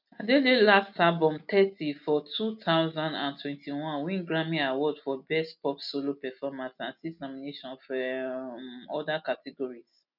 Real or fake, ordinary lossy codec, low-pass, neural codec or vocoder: real; none; 5.4 kHz; none